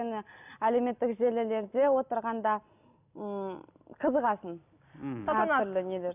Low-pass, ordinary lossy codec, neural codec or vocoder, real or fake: 3.6 kHz; none; none; real